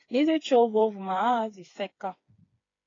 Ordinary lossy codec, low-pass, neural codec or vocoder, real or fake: AAC, 32 kbps; 7.2 kHz; codec, 16 kHz, 4 kbps, FreqCodec, smaller model; fake